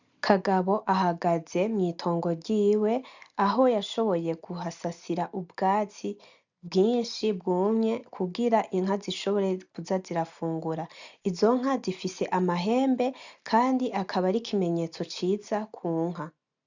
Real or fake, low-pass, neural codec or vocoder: real; 7.2 kHz; none